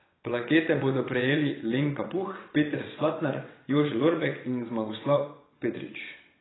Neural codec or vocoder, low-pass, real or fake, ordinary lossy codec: vocoder, 22.05 kHz, 80 mel bands, WaveNeXt; 7.2 kHz; fake; AAC, 16 kbps